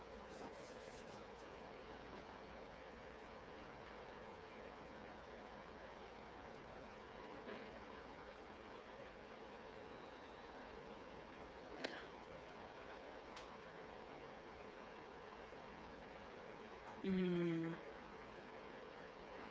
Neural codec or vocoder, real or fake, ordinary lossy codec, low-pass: codec, 16 kHz, 4 kbps, FreqCodec, smaller model; fake; none; none